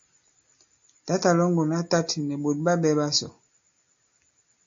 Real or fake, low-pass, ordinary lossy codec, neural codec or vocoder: real; 7.2 kHz; AAC, 64 kbps; none